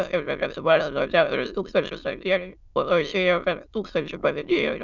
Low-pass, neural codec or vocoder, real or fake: 7.2 kHz; autoencoder, 22.05 kHz, a latent of 192 numbers a frame, VITS, trained on many speakers; fake